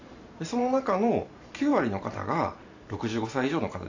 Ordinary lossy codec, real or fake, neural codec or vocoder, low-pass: AAC, 48 kbps; fake; vocoder, 44.1 kHz, 128 mel bands every 512 samples, BigVGAN v2; 7.2 kHz